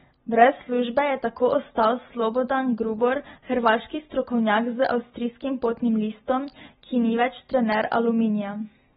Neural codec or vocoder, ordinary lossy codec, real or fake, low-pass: none; AAC, 16 kbps; real; 7.2 kHz